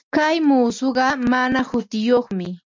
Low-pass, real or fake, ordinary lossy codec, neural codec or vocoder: 7.2 kHz; real; MP3, 64 kbps; none